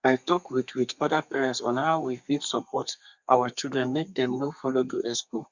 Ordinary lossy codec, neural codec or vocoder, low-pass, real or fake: Opus, 64 kbps; codec, 32 kHz, 1.9 kbps, SNAC; 7.2 kHz; fake